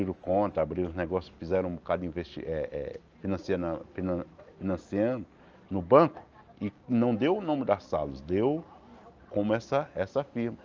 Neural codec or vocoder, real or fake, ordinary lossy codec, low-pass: none; real; Opus, 24 kbps; 7.2 kHz